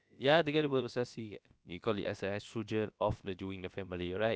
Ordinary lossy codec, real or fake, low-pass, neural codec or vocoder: none; fake; none; codec, 16 kHz, about 1 kbps, DyCAST, with the encoder's durations